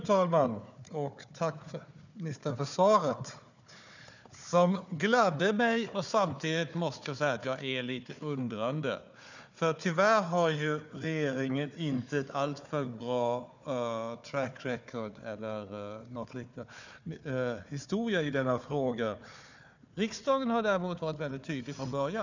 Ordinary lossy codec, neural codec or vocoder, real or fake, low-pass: none; codec, 16 kHz, 4 kbps, FunCodec, trained on Chinese and English, 50 frames a second; fake; 7.2 kHz